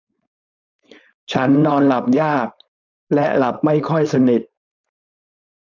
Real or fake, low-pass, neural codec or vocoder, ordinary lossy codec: fake; 7.2 kHz; codec, 16 kHz, 4.8 kbps, FACodec; MP3, 64 kbps